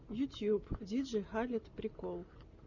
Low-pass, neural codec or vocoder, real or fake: 7.2 kHz; none; real